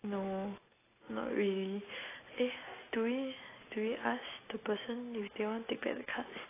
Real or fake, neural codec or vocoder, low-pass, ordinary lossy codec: real; none; 3.6 kHz; AAC, 16 kbps